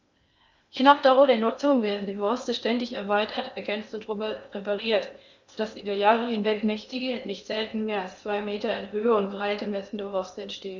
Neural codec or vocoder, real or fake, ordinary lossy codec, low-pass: codec, 16 kHz in and 24 kHz out, 0.8 kbps, FocalCodec, streaming, 65536 codes; fake; Opus, 64 kbps; 7.2 kHz